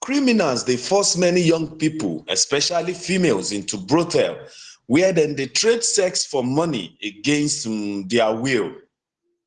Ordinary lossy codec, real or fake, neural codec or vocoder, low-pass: Opus, 16 kbps; real; none; 10.8 kHz